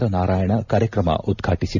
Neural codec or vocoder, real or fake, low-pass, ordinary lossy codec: none; real; 7.2 kHz; none